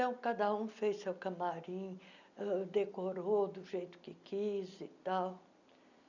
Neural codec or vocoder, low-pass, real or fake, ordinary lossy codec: none; 7.2 kHz; real; none